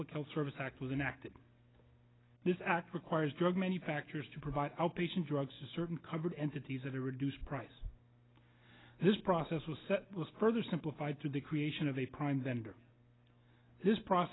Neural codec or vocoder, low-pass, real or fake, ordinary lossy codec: none; 7.2 kHz; real; AAC, 16 kbps